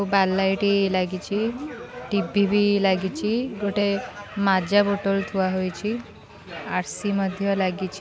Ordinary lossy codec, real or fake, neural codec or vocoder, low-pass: none; real; none; none